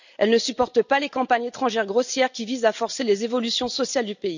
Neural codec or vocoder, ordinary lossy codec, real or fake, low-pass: none; none; real; 7.2 kHz